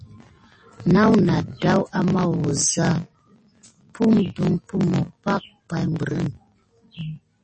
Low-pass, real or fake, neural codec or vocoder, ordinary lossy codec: 10.8 kHz; real; none; MP3, 32 kbps